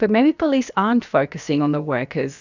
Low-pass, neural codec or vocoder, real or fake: 7.2 kHz; codec, 16 kHz, about 1 kbps, DyCAST, with the encoder's durations; fake